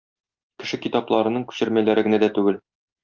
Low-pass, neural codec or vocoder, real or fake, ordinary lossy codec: 7.2 kHz; none; real; Opus, 24 kbps